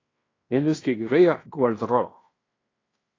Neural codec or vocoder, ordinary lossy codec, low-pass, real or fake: codec, 16 kHz in and 24 kHz out, 0.9 kbps, LongCat-Audio-Codec, fine tuned four codebook decoder; AAC, 32 kbps; 7.2 kHz; fake